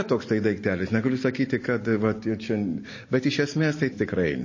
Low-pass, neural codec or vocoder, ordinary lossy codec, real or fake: 7.2 kHz; none; MP3, 32 kbps; real